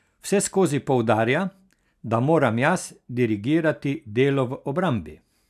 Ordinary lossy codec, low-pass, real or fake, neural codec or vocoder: none; 14.4 kHz; real; none